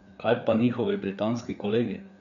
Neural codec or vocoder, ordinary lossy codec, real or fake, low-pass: codec, 16 kHz, 4 kbps, FreqCodec, larger model; none; fake; 7.2 kHz